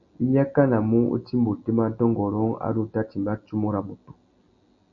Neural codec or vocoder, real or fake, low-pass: none; real; 7.2 kHz